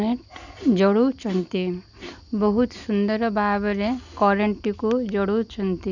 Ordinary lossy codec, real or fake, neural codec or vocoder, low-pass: none; real; none; 7.2 kHz